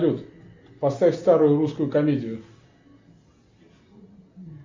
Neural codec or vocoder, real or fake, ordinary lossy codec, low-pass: none; real; MP3, 64 kbps; 7.2 kHz